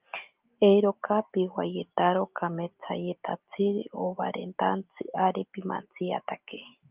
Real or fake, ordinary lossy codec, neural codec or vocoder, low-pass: real; Opus, 24 kbps; none; 3.6 kHz